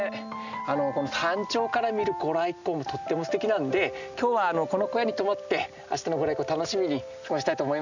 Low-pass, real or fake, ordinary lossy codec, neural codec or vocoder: 7.2 kHz; real; none; none